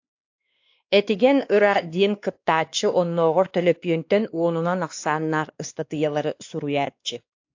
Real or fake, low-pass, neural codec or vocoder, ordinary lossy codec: fake; 7.2 kHz; codec, 16 kHz, 2 kbps, X-Codec, WavLM features, trained on Multilingual LibriSpeech; AAC, 48 kbps